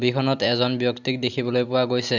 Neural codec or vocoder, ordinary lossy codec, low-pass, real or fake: none; none; 7.2 kHz; real